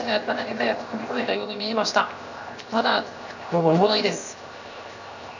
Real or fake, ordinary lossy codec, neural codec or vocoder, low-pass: fake; none; codec, 16 kHz, 0.7 kbps, FocalCodec; 7.2 kHz